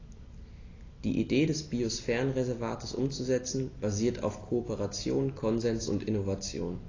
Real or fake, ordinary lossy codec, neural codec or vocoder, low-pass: real; AAC, 32 kbps; none; 7.2 kHz